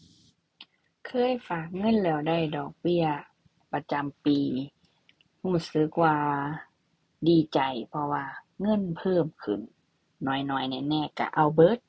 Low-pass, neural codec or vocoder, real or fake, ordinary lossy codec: none; none; real; none